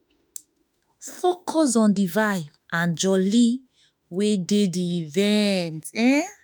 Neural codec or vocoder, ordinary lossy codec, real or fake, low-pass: autoencoder, 48 kHz, 32 numbers a frame, DAC-VAE, trained on Japanese speech; none; fake; none